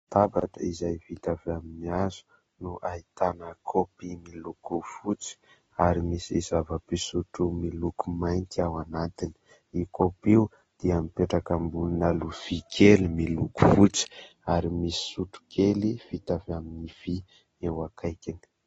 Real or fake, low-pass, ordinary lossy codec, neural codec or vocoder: real; 10.8 kHz; AAC, 24 kbps; none